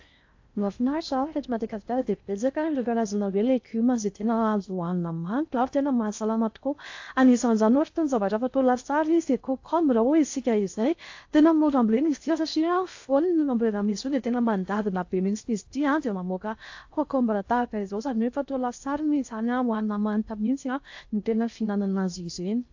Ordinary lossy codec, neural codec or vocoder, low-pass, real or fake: AAC, 48 kbps; codec, 16 kHz in and 24 kHz out, 0.6 kbps, FocalCodec, streaming, 4096 codes; 7.2 kHz; fake